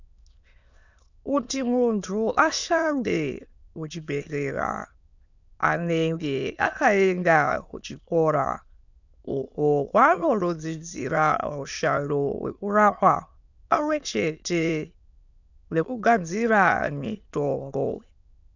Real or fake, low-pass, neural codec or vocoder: fake; 7.2 kHz; autoencoder, 22.05 kHz, a latent of 192 numbers a frame, VITS, trained on many speakers